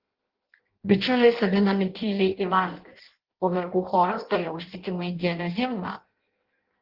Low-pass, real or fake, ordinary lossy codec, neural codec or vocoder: 5.4 kHz; fake; Opus, 16 kbps; codec, 16 kHz in and 24 kHz out, 0.6 kbps, FireRedTTS-2 codec